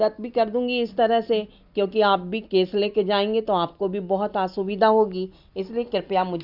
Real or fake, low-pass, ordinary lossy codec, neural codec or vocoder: real; 5.4 kHz; none; none